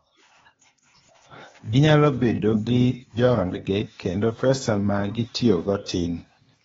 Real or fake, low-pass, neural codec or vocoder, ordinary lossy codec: fake; 7.2 kHz; codec, 16 kHz, 0.8 kbps, ZipCodec; AAC, 24 kbps